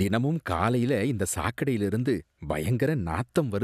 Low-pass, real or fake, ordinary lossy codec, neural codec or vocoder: 14.4 kHz; real; none; none